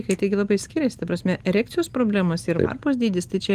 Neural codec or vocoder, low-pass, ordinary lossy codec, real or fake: none; 14.4 kHz; Opus, 32 kbps; real